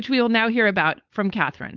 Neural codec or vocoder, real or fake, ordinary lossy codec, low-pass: codec, 16 kHz, 4.8 kbps, FACodec; fake; Opus, 32 kbps; 7.2 kHz